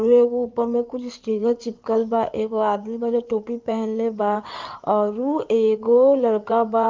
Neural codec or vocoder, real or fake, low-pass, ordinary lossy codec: codec, 16 kHz in and 24 kHz out, 2.2 kbps, FireRedTTS-2 codec; fake; 7.2 kHz; Opus, 24 kbps